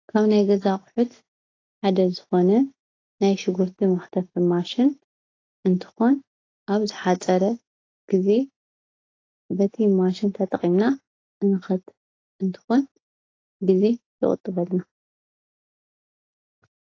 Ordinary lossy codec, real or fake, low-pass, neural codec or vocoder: AAC, 32 kbps; real; 7.2 kHz; none